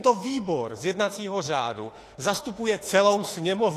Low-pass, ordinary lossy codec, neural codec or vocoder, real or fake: 14.4 kHz; AAC, 48 kbps; autoencoder, 48 kHz, 32 numbers a frame, DAC-VAE, trained on Japanese speech; fake